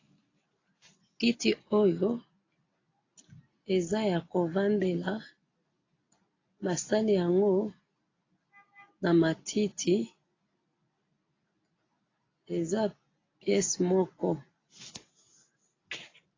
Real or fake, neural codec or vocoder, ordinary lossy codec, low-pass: real; none; AAC, 32 kbps; 7.2 kHz